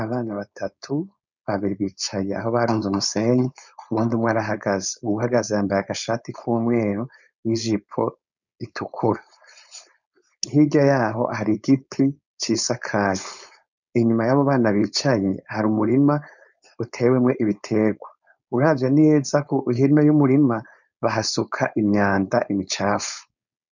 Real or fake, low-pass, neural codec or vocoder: fake; 7.2 kHz; codec, 16 kHz, 4.8 kbps, FACodec